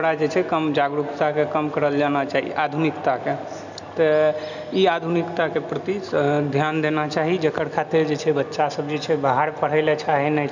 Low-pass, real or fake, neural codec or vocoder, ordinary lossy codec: 7.2 kHz; real; none; none